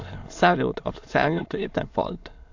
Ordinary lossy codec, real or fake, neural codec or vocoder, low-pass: AAC, 48 kbps; fake; autoencoder, 22.05 kHz, a latent of 192 numbers a frame, VITS, trained on many speakers; 7.2 kHz